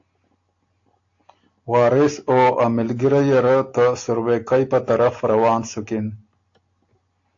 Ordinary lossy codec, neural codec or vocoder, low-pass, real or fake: AAC, 48 kbps; none; 7.2 kHz; real